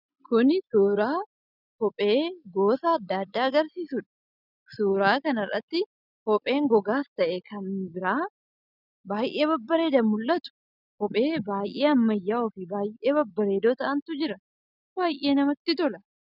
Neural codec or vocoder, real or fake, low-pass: none; real; 5.4 kHz